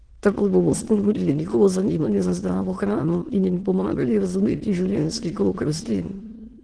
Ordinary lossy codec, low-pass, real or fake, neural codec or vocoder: Opus, 16 kbps; 9.9 kHz; fake; autoencoder, 22.05 kHz, a latent of 192 numbers a frame, VITS, trained on many speakers